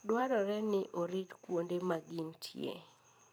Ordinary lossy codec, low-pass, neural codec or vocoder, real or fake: none; none; vocoder, 44.1 kHz, 128 mel bands every 512 samples, BigVGAN v2; fake